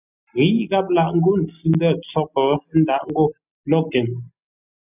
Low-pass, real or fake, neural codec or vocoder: 3.6 kHz; real; none